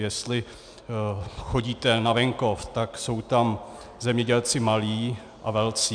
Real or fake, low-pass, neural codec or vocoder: real; 9.9 kHz; none